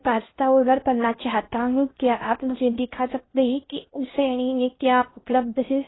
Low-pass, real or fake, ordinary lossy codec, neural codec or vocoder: 7.2 kHz; fake; AAC, 16 kbps; codec, 16 kHz in and 24 kHz out, 0.6 kbps, FocalCodec, streaming, 2048 codes